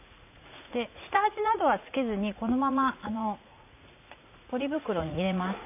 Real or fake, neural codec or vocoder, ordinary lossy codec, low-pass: fake; vocoder, 44.1 kHz, 80 mel bands, Vocos; none; 3.6 kHz